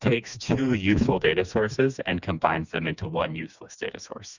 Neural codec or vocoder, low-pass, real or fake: codec, 16 kHz, 2 kbps, FreqCodec, smaller model; 7.2 kHz; fake